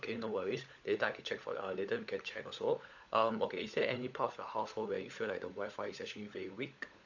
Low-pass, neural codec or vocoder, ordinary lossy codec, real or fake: 7.2 kHz; codec, 16 kHz, 16 kbps, FunCodec, trained on LibriTTS, 50 frames a second; none; fake